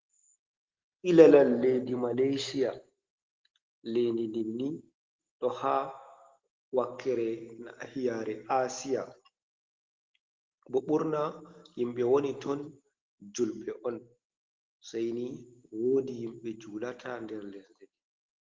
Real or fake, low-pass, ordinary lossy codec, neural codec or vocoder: real; 7.2 kHz; Opus, 16 kbps; none